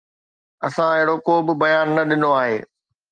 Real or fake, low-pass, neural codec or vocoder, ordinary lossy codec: real; 9.9 kHz; none; Opus, 32 kbps